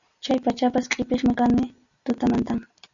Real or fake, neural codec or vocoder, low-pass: real; none; 7.2 kHz